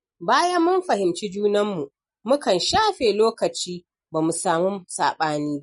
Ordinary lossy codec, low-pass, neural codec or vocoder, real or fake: MP3, 48 kbps; 10.8 kHz; none; real